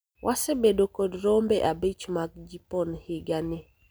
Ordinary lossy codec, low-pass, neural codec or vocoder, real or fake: none; none; none; real